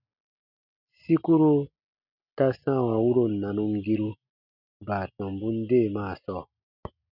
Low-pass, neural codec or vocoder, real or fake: 5.4 kHz; none; real